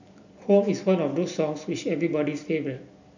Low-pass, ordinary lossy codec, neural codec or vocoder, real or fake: 7.2 kHz; none; none; real